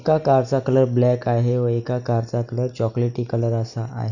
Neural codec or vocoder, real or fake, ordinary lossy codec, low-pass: none; real; none; 7.2 kHz